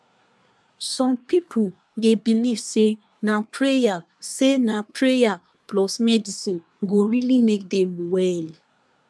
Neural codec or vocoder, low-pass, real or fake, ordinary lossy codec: codec, 24 kHz, 1 kbps, SNAC; none; fake; none